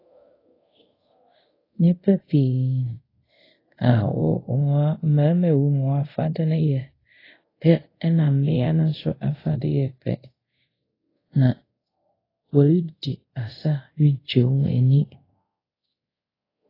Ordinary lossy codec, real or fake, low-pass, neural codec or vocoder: AAC, 24 kbps; fake; 5.4 kHz; codec, 24 kHz, 0.5 kbps, DualCodec